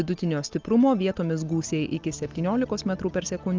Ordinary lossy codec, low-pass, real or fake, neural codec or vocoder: Opus, 24 kbps; 7.2 kHz; real; none